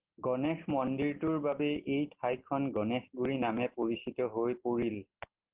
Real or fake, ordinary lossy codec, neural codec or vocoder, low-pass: real; Opus, 16 kbps; none; 3.6 kHz